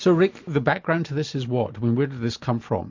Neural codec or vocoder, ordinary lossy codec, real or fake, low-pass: none; MP3, 48 kbps; real; 7.2 kHz